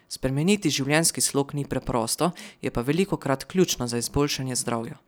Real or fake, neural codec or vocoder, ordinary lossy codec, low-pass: real; none; none; none